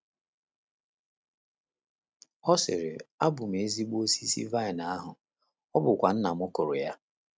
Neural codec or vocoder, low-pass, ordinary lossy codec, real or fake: none; none; none; real